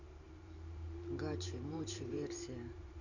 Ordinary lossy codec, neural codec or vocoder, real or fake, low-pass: none; vocoder, 44.1 kHz, 128 mel bands every 512 samples, BigVGAN v2; fake; 7.2 kHz